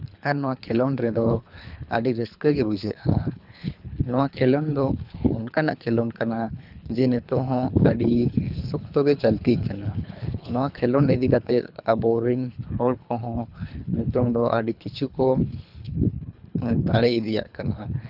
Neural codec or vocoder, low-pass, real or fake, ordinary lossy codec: codec, 24 kHz, 3 kbps, HILCodec; 5.4 kHz; fake; none